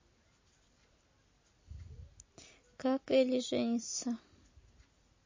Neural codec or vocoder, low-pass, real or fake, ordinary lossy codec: none; 7.2 kHz; real; MP3, 32 kbps